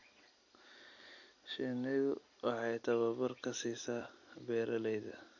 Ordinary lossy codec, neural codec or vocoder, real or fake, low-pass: none; none; real; 7.2 kHz